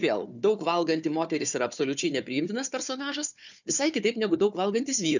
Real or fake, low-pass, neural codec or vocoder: fake; 7.2 kHz; codec, 16 kHz, 4 kbps, FunCodec, trained on Chinese and English, 50 frames a second